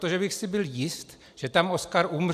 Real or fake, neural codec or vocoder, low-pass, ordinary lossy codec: real; none; 14.4 kHz; AAC, 96 kbps